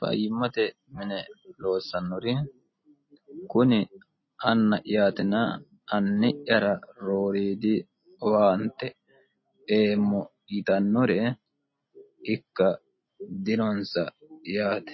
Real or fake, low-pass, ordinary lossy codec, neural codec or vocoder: real; 7.2 kHz; MP3, 24 kbps; none